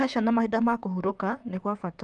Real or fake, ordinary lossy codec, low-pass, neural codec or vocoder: fake; Opus, 32 kbps; 10.8 kHz; vocoder, 44.1 kHz, 128 mel bands, Pupu-Vocoder